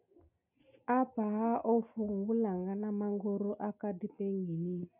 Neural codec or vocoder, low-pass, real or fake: none; 3.6 kHz; real